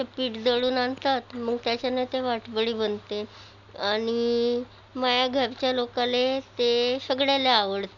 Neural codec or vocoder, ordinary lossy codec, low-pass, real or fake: none; none; 7.2 kHz; real